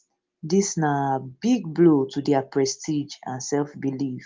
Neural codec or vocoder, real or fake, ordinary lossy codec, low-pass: none; real; Opus, 32 kbps; 7.2 kHz